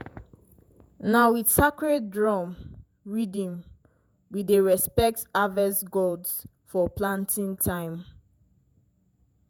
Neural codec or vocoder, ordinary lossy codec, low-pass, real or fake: vocoder, 48 kHz, 128 mel bands, Vocos; none; none; fake